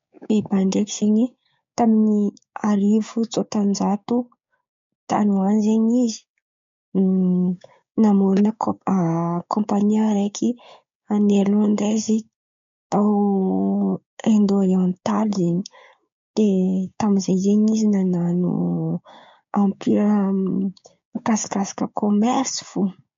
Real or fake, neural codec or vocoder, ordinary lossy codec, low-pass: fake; codec, 16 kHz, 6 kbps, DAC; MP3, 48 kbps; 7.2 kHz